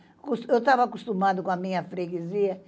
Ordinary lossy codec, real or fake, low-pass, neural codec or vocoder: none; real; none; none